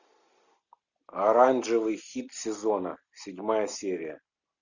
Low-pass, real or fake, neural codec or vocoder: 7.2 kHz; real; none